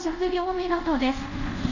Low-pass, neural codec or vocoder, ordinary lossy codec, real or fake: 7.2 kHz; codec, 24 kHz, 0.5 kbps, DualCodec; none; fake